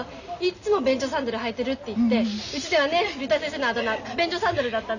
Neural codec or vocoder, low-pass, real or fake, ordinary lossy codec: none; 7.2 kHz; real; none